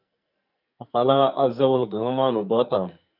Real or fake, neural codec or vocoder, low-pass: fake; codec, 44.1 kHz, 2.6 kbps, SNAC; 5.4 kHz